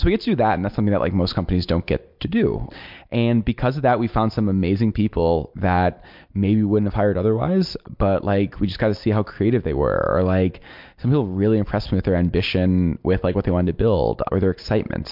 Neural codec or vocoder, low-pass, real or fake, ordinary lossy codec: none; 5.4 kHz; real; MP3, 48 kbps